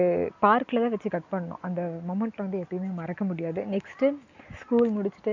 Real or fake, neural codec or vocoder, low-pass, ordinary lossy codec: real; none; 7.2 kHz; MP3, 64 kbps